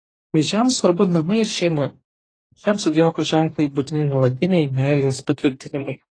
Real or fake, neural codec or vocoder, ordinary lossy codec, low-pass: fake; codec, 44.1 kHz, 2.6 kbps, DAC; AAC, 32 kbps; 9.9 kHz